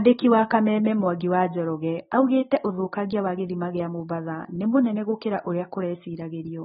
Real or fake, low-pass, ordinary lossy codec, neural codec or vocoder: real; 19.8 kHz; AAC, 16 kbps; none